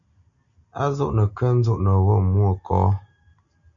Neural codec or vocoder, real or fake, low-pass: none; real; 7.2 kHz